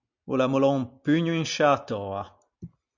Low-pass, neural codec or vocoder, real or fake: 7.2 kHz; none; real